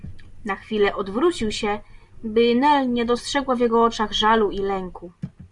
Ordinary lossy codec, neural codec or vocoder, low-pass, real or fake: Opus, 64 kbps; none; 10.8 kHz; real